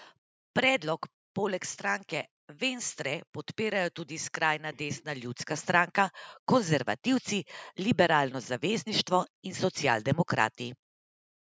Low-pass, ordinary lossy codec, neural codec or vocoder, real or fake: none; none; none; real